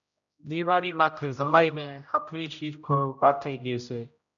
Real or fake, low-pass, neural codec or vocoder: fake; 7.2 kHz; codec, 16 kHz, 0.5 kbps, X-Codec, HuBERT features, trained on general audio